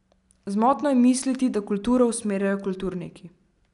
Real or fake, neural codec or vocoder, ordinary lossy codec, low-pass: real; none; none; 10.8 kHz